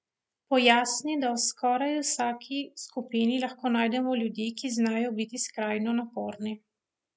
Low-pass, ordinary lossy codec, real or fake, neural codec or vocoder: none; none; real; none